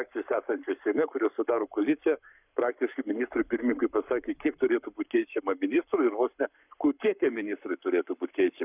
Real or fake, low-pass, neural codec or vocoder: fake; 3.6 kHz; codec, 44.1 kHz, 7.8 kbps, Pupu-Codec